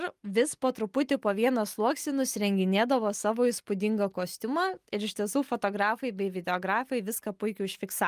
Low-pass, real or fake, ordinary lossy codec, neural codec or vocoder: 14.4 kHz; real; Opus, 32 kbps; none